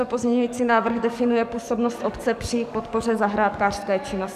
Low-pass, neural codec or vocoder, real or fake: 14.4 kHz; codec, 44.1 kHz, 7.8 kbps, DAC; fake